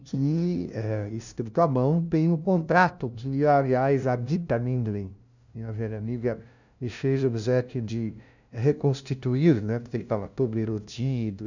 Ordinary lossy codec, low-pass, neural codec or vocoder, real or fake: none; 7.2 kHz; codec, 16 kHz, 0.5 kbps, FunCodec, trained on LibriTTS, 25 frames a second; fake